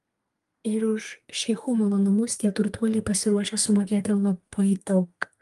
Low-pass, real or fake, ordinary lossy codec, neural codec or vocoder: 14.4 kHz; fake; Opus, 32 kbps; codec, 32 kHz, 1.9 kbps, SNAC